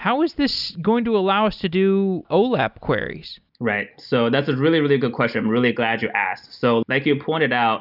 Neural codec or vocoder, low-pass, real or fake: none; 5.4 kHz; real